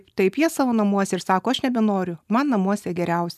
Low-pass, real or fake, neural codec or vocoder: 14.4 kHz; real; none